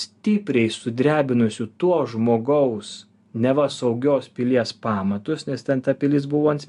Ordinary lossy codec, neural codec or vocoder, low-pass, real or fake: AAC, 96 kbps; none; 10.8 kHz; real